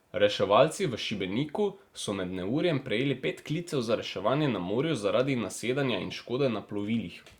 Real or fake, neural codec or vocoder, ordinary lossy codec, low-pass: real; none; Opus, 64 kbps; 19.8 kHz